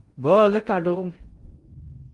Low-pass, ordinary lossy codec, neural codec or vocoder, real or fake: 10.8 kHz; Opus, 24 kbps; codec, 16 kHz in and 24 kHz out, 0.6 kbps, FocalCodec, streaming, 4096 codes; fake